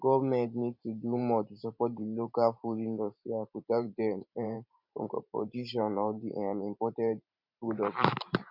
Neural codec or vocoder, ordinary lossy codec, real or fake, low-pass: vocoder, 44.1 kHz, 128 mel bands every 512 samples, BigVGAN v2; none; fake; 5.4 kHz